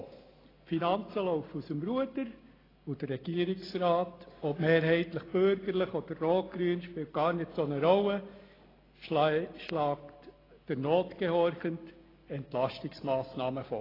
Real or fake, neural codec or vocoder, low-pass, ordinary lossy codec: real; none; 5.4 kHz; AAC, 24 kbps